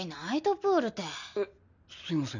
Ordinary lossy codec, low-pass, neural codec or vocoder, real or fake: none; 7.2 kHz; none; real